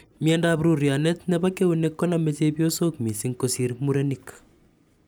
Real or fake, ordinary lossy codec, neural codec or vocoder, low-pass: real; none; none; none